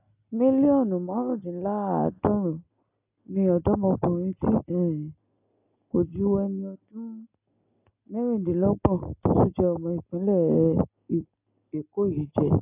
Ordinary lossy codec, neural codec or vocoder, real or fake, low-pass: AAC, 32 kbps; none; real; 3.6 kHz